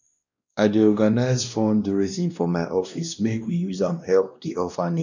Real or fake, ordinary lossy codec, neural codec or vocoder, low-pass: fake; none; codec, 16 kHz, 1 kbps, X-Codec, WavLM features, trained on Multilingual LibriSpeech; 7.2 kHz